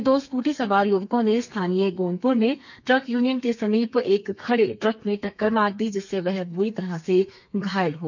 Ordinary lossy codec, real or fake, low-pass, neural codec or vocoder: none; fake; 7.2 kHz; codec, 32 kHz, 1.9 kbps, SNAC